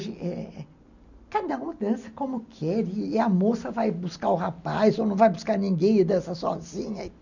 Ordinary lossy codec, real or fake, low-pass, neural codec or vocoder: none; real; 7.2 kHz; none